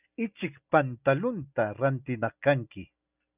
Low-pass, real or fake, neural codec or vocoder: 3.6 kHz; real; none